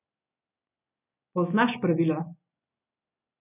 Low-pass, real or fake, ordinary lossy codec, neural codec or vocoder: 3.6 kHz; real; none; none